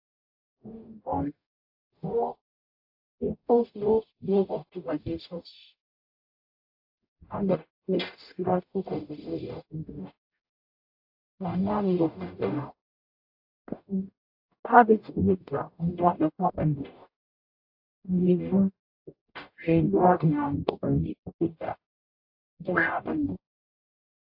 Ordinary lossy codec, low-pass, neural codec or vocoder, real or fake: AAC, 48 kbps; 5.4 kHz; codec, 44.1 kHz, 0.9 kbps, DAC; fake